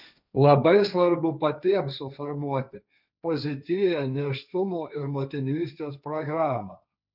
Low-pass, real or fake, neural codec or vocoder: 5.4 kHz; fake; codec, 16 kHz, 1.1 kbps, Voila-Tokenizer